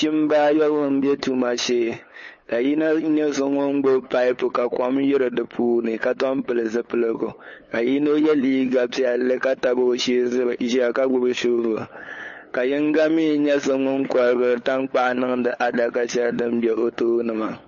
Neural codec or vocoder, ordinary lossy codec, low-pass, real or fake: codec, 16 kHz, 8 kbps, FunCodec, trained on LibriTTS, 25 frames a second; MP3, 32 kbps; 7.2 kHz; fake